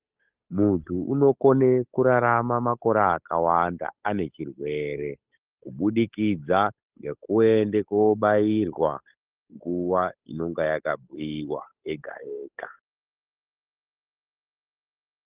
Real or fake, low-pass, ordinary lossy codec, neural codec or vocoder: fake; 3.6 kHz; Opus, 24 kbps; codec, 16 kHz, 8 kbps, FunCodec, trained on Chinese and English, 25 frames a second